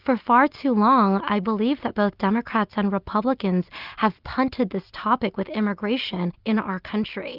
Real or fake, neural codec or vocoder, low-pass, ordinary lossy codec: real; none; 5.4 kHz; Opus, 32 kbps